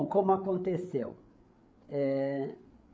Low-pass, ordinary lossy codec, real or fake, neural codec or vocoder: none; none; fake; codec, 16 kHz, 8 kbps, FreqCodec, larger model